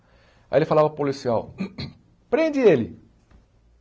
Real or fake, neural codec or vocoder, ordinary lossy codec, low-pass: real; none; none; none